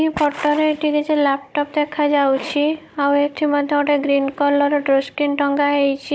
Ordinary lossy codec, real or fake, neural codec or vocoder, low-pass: none; fake; codec, 16 kHz, 16 kbps, FunCodec, trained on Chinese and English, 50 frames a second; none